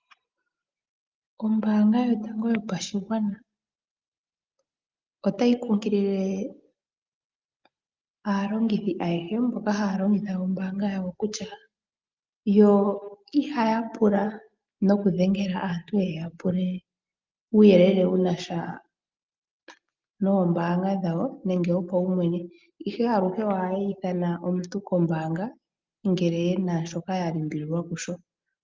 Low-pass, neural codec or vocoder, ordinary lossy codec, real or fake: 7.2 kHz; none; Opus, 24 kbps; real